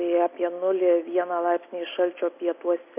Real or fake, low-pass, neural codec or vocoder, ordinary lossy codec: real; 3.6 kHz; none; MP3, 24 kbps